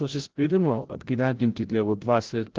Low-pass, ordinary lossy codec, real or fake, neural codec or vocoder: 7.2 kHz; Opus, 16 kbps; fake; codec, 16 kHz, 0.5 kbps, FreqCodec, larger model